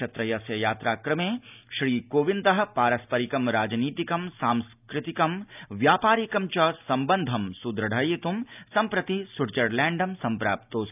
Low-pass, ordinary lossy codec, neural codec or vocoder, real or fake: 3.6 kHz; none; none; real